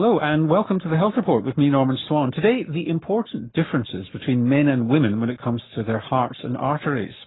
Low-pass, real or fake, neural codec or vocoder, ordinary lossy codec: 7.2 kHz; fake; codec, 44.1 kHz, 7.8 kbps, Pupu-Codec; AAC, 16 kbps